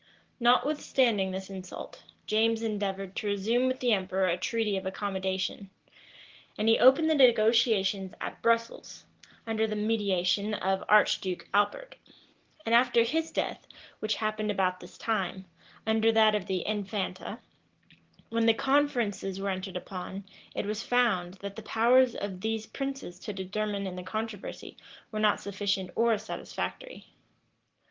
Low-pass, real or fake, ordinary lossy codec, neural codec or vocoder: 7.2 kHz; real; Opus, 16 kbps; none